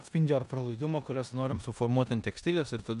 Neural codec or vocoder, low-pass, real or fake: codec, 16 kHz in and 24 kHz out, 0.9 kbps, LongCat-Audio-Codec, four codebook decoder; 10.8 kHz; fake